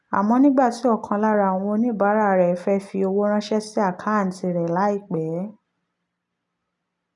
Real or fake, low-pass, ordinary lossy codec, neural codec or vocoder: real; 10.8 kHz; none; none